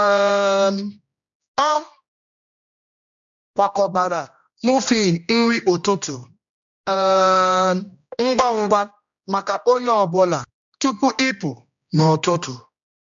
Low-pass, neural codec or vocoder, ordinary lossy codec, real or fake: 7.2 kHz; codec, 16 kHz, 1 kbps, X-Codec, HuBERT features, trained on general audio; MP3, 64 kbps; fake